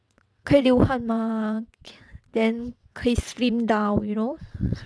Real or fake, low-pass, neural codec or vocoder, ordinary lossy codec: fake; none; vocoder, 22.05 kHz, 80 mel bands, WaveNeXt; none